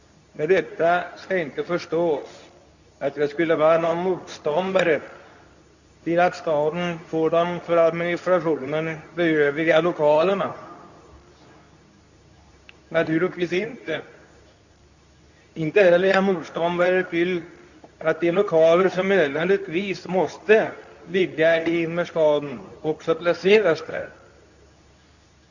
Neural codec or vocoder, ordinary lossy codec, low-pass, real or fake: codec, 24 kHz, 0.9 kbps, WavTokenizer, medium speech release version 2; none; 7.2 kHz; fake